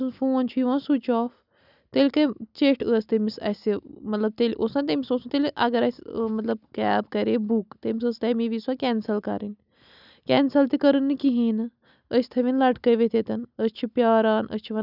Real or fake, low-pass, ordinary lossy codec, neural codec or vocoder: real; 5.4 kHz; none; none